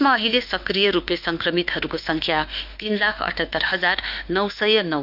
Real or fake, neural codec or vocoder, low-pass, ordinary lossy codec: fake; autoencoder, 48 kHz, 32 numbers a frame, DAC-VAE, trained on Japanese speech; 5.4 kHz; none